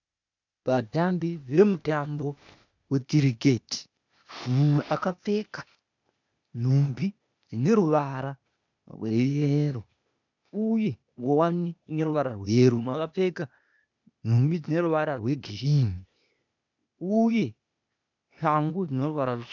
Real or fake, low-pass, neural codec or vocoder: fake; 7.2 kHz; codec, 16 kHz, 0.8 kbps, ZipCodec